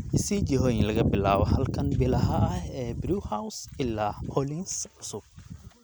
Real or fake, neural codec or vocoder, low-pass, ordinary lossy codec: real; none; none; none